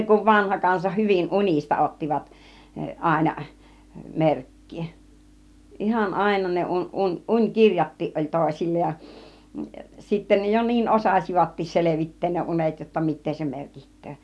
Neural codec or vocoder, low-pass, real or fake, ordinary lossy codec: none; none; real; none